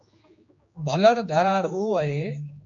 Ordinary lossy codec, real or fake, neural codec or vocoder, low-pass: MP3, 48 kbps; fake; codec, 16 kHz, 2 kbps, X-Codec, HuBERT features, trained on general audio; 7.2 kHz